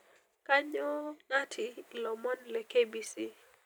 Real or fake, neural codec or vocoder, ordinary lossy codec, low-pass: fake; vocoder, 44.1 kHz, 128 mel bands every 256 samples, BigVGAN v2; none; none